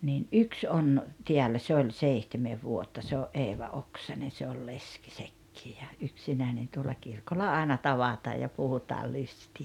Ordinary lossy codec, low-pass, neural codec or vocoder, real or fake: none; 19.8 kHz; none; real